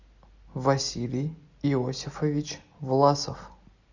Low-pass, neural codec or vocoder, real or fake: 7.2 kHz; none; real